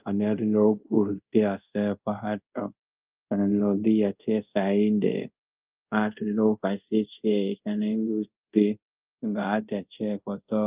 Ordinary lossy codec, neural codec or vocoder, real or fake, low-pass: Opus, 24 kbps; codec, 24 kHz, 0.5 kbps, DualCodec; fake; 3.6 kHz